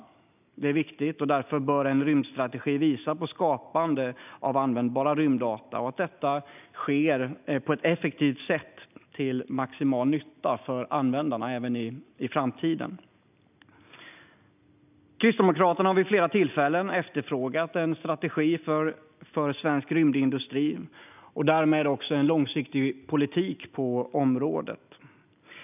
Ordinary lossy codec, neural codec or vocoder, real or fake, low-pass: none; none; real; 3.6 kHz